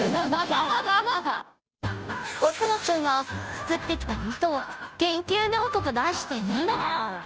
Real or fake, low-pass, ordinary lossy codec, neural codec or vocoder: fake; none; none; codec, 16 kHz, 0.5 kbps, FunCodec, trained on Chinese and English, 25 frames a second